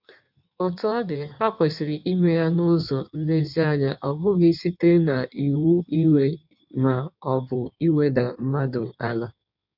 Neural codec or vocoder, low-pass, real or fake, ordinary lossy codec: codec, 16 kHz in and 24 kHz out, 1.1 kbps, FireRedTTS-2 codec; 5.4 kHz; fake; none